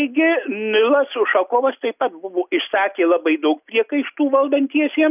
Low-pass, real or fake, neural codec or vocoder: 3.6 kHz; real; none